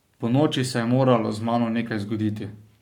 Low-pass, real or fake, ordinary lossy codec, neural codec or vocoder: 19.8 kHz; fake; none; codec, 44.1 kHz, 7.8 kbps, Pupu-Codec